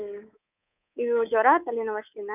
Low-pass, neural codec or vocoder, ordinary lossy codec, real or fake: 3.6 kHz; codec, 24 kHz, 3.1 kbps, DualCodec; none; fake